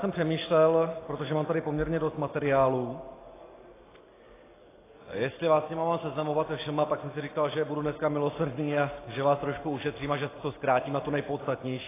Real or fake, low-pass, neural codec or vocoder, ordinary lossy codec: real; 3.6 kHz; none; AAC, 16 kbps